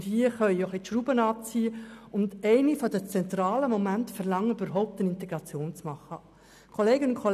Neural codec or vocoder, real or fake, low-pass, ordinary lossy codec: none; real; 14.4 kHz; none